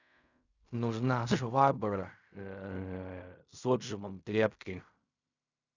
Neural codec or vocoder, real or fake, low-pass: codec, 16 kHz in and 24 kHz out, 0.4 kbps, LongCat-Audio-Codec, fine tuned four codebook decoder; fake; 7.2 kHz